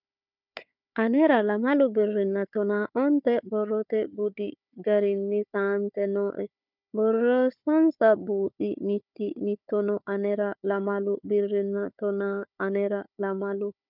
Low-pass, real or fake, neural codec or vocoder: 5.4 kHz; fake; codec, 16 kHz, 4 kbps, FunCodec, trained on Chinese and English, 50 frames a second